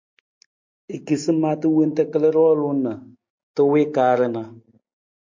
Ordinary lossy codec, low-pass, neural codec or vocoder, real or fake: MP3, 48 kbps; 7.2 kHz; none; real